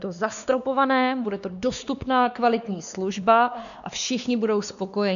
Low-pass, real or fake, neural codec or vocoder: 7.2 kHz; fake; codec, 16 kHz, 2 kbps, X-Codec, WavLM features, trained on Multilingual LibriSpeech